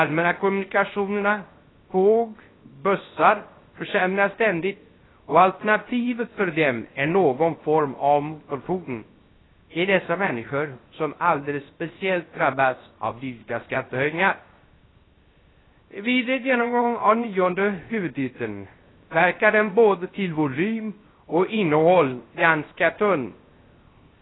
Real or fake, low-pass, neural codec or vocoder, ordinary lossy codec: fake; 7.2 kHz; codec, 16 kHz, 0.3 kbps, FocalCodec; AAC, 16 kbps